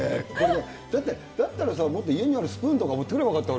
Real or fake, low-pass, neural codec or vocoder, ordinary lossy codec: real; none; none; none